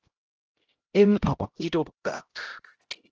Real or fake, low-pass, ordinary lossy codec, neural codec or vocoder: fake; 7.2 kHz; Opus, 16 kbps; codec, 16 kHz, 0.5 kbps, X-Codec, HuBERT features, trained on LibriSpeech